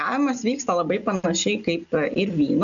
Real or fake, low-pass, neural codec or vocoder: real; 7.2 kHz; none